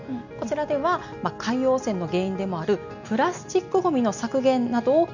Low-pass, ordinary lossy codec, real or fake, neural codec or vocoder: 7.2 kHz; none; real; none